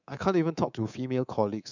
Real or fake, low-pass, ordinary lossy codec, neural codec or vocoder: fake; 7.2 kHz; none; codec, 24 kHz, 3.1 kbps, DualCodec